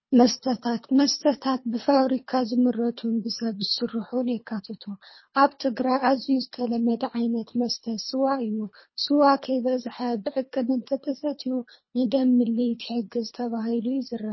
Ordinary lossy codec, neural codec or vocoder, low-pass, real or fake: MP3, 24 kbps; codec, 24 kHz, 3 kbps, HILCodec; 7.2 kHz; fake